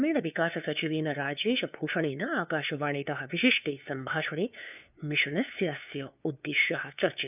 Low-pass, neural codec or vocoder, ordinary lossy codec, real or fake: 3.6 kHz; codec, 16 kHz, 2 kbps, FunCodec, trained on LibriTTS, 25 frames a second; none; fake